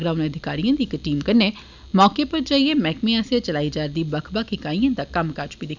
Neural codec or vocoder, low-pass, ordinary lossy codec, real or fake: none; 7.2 kHz; none; real